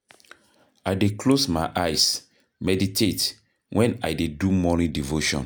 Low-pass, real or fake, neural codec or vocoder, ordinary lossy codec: none; real; none; none